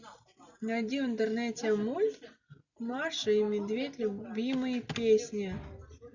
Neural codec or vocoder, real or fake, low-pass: none; real; 7.2 kHz